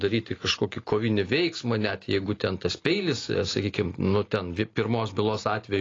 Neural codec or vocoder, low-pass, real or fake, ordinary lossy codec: none; 7.2 kHz; real; AAC, 32 kbps